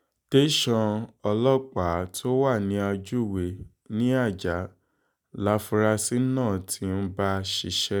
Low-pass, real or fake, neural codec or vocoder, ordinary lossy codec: none; real; none; none